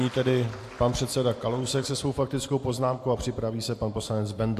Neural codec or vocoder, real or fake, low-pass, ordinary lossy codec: none; real; 14.4 kHz; AAC, 64 kbps